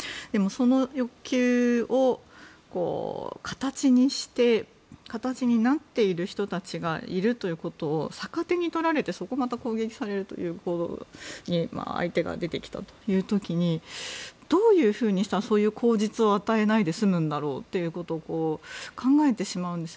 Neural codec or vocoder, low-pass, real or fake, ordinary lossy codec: none; none; real; none